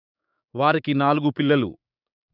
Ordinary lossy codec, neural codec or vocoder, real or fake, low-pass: none; codec, 16 kHz, 6 kbps, DAC; fake; 5.4 kHz